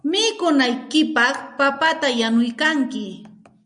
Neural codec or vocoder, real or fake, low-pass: none; real; 9.9 kHz